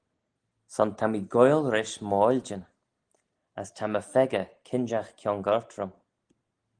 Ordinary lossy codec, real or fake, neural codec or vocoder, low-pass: Opus, 24 kbps; real; none; 9.9 kHz